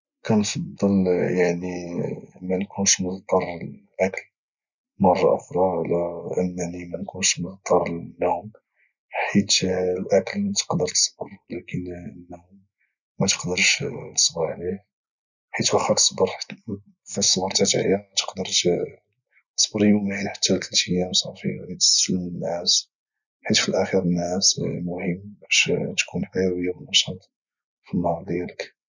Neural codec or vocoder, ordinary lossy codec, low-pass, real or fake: none; none; 7.2 kHz; real